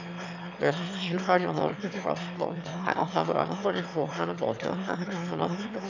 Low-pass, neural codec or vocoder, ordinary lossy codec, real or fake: 7.2 kHz; autoencoder, 22.05 kHz, a latent of 192 numbers a frame, VITS, trained on one speaker; none; fake